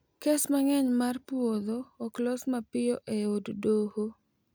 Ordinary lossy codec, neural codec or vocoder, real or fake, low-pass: none; none; real; none